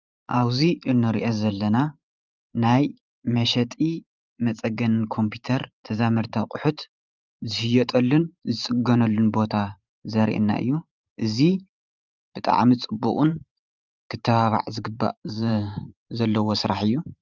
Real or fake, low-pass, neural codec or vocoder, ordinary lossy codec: fake; 7.2 kHz; vocoder, 44.1 kHz, 128 mel bands every 512 samples, BigVGAN v2; Opus, 24 kbps